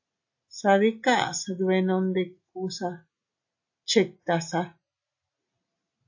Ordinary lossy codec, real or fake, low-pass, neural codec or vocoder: AAC, 48 kbps; real; 7.2 kHz; none